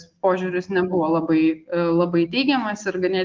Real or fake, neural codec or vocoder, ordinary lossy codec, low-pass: real; none; Opus, 32 kbps; 7.2 kHz